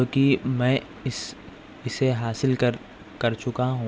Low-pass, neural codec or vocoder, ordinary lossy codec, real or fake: none; none; none; real